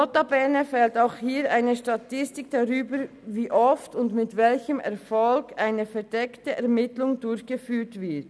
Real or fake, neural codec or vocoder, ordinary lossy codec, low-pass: real; none; none; none